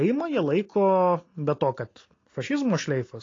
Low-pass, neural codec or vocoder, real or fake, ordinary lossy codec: 7.2 kHz; none; real; AAC, 32 kbps